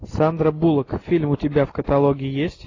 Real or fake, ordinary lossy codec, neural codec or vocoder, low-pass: real; AAC, 32 kbps; none; 7.2 kHz